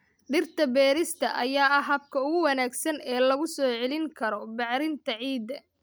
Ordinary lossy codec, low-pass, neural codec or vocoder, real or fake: none; none; none; real